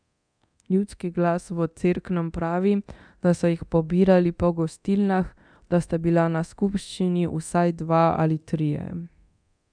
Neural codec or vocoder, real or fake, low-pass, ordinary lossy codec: codec, 24 kHz, 0.9 kbps, DualCodec; fake; 9.9 kHz; none